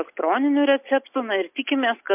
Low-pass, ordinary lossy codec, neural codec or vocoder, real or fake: 3.6 kHz; MP3, 32 kbps; none; real